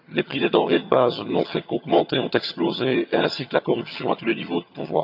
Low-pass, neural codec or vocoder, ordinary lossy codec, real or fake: 5.4 kHz; vocoder, 22.05 kHz, 80 mel bands, HiFi-GAN; none; fake